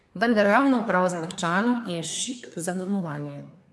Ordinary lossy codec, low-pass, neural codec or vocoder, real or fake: none; none; codec, 24 kHz, 1 kbps, SNAC; fake